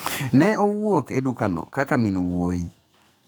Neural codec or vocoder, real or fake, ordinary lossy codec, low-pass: codec, 44.1 kHz, 2.6 kbps, SNAC; fake; none; none